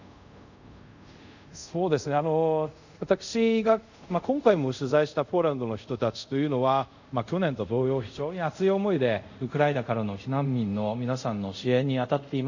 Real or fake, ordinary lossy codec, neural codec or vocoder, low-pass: fake; none; codec, 24 kHz, 0.5 kbps, DualCodec; 7.2 kHz